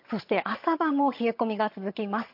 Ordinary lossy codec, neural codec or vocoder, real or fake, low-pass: MP3, 32 kbps; vocoder, 22.05 kHz, 80 mel bands, HiFi-GAN; fake; 5.4 kHz